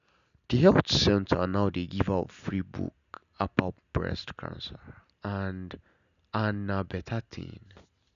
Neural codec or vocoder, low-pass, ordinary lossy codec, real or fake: none; 7.2 kHz; none; real